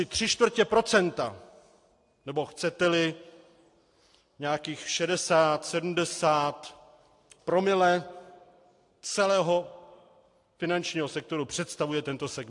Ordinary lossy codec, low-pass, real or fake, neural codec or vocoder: AAC, 64 kbps; 10.8 kHz; real; none